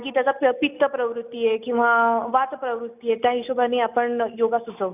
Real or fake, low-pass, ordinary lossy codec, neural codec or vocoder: real; 3.6 kHz; none; none